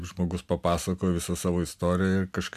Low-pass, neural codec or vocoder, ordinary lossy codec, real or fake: 14.4 kHz; none; MP3, 96 kbps; real